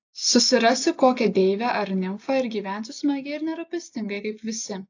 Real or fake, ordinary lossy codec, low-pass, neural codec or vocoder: real; AAC, 48 kbps; 7.2 kHz; none